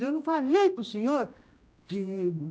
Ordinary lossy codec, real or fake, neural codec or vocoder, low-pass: none; fake; codec, 16 kHz, 1 kbps, X-Codec, HuBERT features, trained on general audio; none